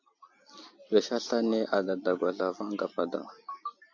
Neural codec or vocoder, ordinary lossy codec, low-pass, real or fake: none; AAC, 48 kbps; 7.2 kHz; real